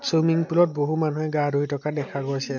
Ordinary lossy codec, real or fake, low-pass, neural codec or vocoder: MP3, 48 kbps; real; 7.2 kHz; none